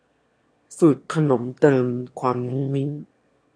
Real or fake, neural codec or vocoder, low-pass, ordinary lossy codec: fake; autoencoder, 22.05 kHz, a latent of 192 numbers a frame, VITS, trained on one speaker; 9.9 kHz; AAC, 48 kbps